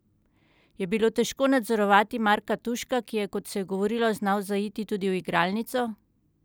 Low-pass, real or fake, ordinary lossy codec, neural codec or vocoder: none; real; none; none